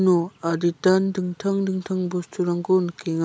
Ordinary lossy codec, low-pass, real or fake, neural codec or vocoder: none; none; real; none